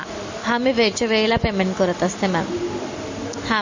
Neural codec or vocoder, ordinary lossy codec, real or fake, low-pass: none; MP3, 32 kbps; real; 7.2 kHz